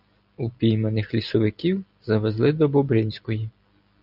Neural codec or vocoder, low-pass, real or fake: none; 5.4 kHz; real